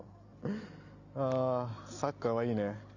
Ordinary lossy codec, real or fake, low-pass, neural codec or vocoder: none; real; 7.2 kHz; none